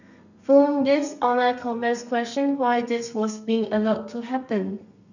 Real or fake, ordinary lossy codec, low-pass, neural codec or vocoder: fake; none; 7.2 kHz; codec, 32 kHz, 1.9 kbps, SNAC